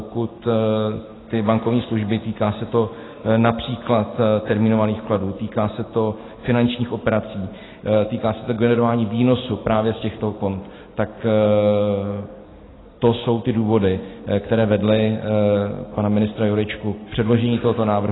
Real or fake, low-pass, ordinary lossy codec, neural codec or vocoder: real; 7.2 kHz; AAC, 16 kbps; none